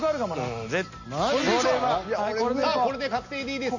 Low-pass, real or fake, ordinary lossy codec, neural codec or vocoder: 7.2 kHz; real; none; none